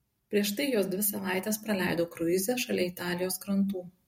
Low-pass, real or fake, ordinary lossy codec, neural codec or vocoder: 19.8 kHz; real; MP3, 64 kbps; none